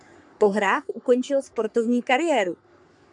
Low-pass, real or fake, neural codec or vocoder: 10.8 kHz; fake; codec, 44.1 kHz, 3.4 kbps, Pupu-Codec